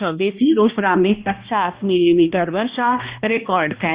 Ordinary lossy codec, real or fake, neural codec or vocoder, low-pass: Opus, 64 kbps; fake; codec, 16 kHz, 1 kbps, X-Codec, HuBERT features, trained on balanced general audio; 3.6 kHz